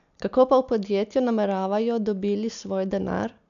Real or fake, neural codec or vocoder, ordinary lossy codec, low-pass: real; none; none; 7.2 kHz